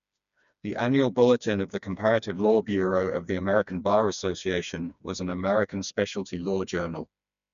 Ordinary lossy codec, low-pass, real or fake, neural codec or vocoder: none; 7.2 kHz; fake; codec, 16 kHz, 2 kbps, FreqCodec, smaller model